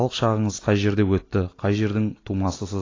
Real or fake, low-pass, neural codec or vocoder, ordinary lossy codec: real; 7.2 kHz; none; AAC, 32 kbps